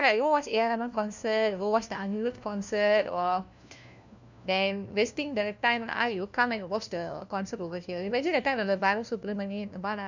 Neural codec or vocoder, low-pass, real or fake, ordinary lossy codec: codec, 16 kHz, 1 kbps, FunCodec, trained on LibriTTS, 50 frames a second; 7.2 kHz; fake; none